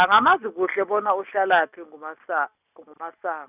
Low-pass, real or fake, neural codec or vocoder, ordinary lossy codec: 3.6 kHz; real; none; none